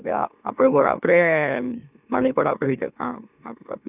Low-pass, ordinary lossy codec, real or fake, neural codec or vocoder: 3.6 kHz; none; fake; autoencoder, 44.1 kHz, a latent of 192 numbers a frame, MeloTTS